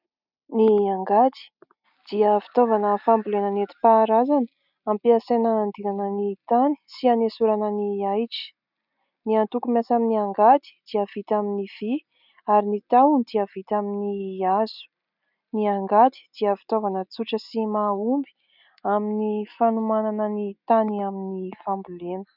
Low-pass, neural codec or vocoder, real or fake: 5.4 kHz; none; real